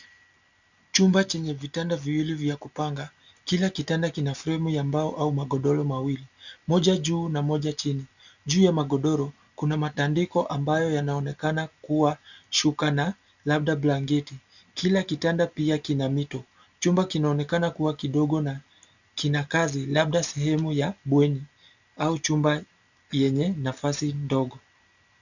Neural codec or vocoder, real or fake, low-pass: none; real; 7.2 kHz